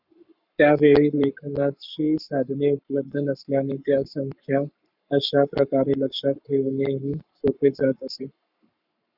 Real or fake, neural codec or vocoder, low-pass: fake; codec, 44.1 kHz, 7.8 kbps, Pupu-Codec; 5.4 kHz